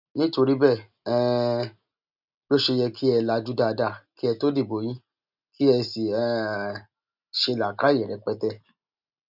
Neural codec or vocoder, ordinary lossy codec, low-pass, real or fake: none; none; 5.4 kHz; real